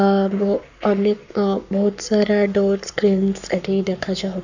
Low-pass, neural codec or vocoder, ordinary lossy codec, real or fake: 7.2 kHz; codec, 44.1 kHz, 7.8 kbps, Pupu-Codec; none; fake